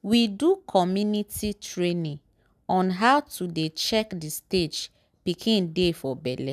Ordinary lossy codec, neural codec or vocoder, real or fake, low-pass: none; none; real; 14.4 kHz